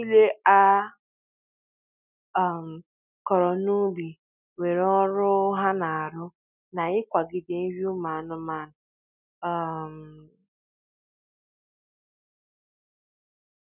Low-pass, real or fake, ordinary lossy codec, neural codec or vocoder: 3.6 kHz; real; none; none